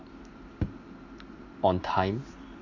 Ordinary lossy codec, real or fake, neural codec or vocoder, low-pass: MP3, 64 kbps; real; none; 7.2 kHz